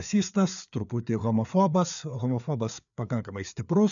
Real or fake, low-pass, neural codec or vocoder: fake; 7.2 kHz; codec, 16 kHz, 4 kbps, FreqCodec, larger model